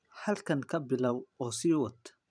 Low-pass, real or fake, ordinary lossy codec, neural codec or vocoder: 9.9 kHz; fake; none; vocoder, 44.1 kHz, 128 mel bands every 512 samples, BigVGAN v2